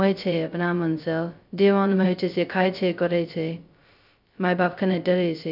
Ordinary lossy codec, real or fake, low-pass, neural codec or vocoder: none; fake; 5.4 kHz; codec, 16 kHz, 0.2 kbps, FocalCodec